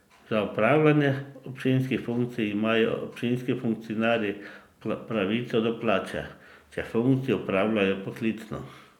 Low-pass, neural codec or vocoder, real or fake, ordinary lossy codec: 19.8 kHz; none; real; none